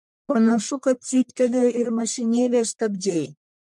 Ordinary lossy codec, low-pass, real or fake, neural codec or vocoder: MP3, 64 kbps; 10.8 kHz; fake; codec, 44.1 kHz, 1.7 kbps, Pupu-Codec